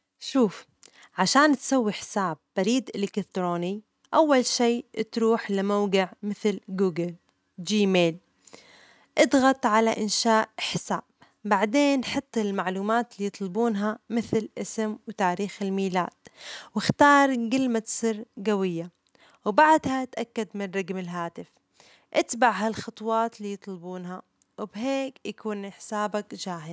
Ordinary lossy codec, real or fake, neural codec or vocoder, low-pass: none; real; none; none